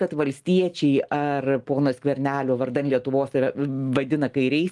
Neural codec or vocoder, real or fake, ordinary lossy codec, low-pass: none; real; Opus, 24 kbps; 10.8 kHz